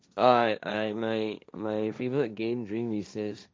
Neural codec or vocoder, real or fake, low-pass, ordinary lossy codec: codec, 16 kHz, 1.1 kbps, Voila-Tokenizer; fake; none; none